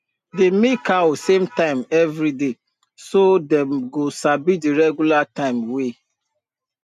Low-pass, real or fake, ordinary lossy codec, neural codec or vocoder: 14.4 kHz; real; none; none